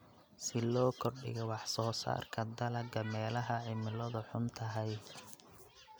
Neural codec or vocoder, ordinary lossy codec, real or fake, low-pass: none; none; real; none